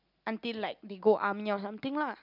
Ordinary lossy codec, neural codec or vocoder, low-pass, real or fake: none; none; 5.4 kHz; real